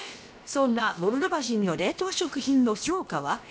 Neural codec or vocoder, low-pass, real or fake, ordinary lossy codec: codec, 16 kHz, about 1 kbps, DyCAST, with the encoder's durations; none; fake; none